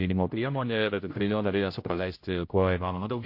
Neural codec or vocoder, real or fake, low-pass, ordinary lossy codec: codec, 16 kHz, 0.5 kbps, X-Codec, HuBERT features, trained on general audio; fake; 5.4 kHz; MP3, 32 kbps